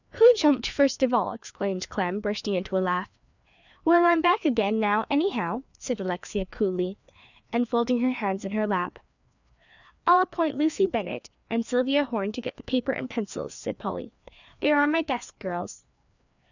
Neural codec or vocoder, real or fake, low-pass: codec, 16 kHz, 2 kbps, FreqCodec, larger model; fake; 7.2 kHz